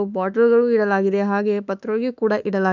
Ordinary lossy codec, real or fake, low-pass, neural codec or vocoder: none; fake; 7.2 kHz; autoencoder, 48 kHz, 32 numbers a frame, DAC-VAE, trained on Japanese speech